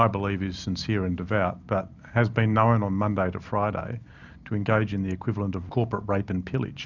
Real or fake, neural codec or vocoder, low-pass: real; none; 7.2 kHz